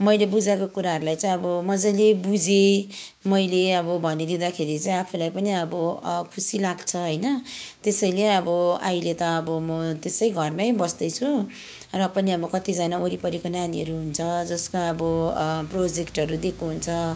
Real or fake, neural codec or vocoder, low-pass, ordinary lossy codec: fake; codec, 16 kHz, 6 kbps, DAC; none; none